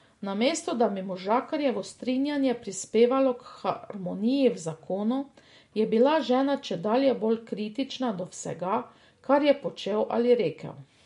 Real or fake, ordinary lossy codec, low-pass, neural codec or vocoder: real; MP3, 48 kbps; 10.8 kHz; none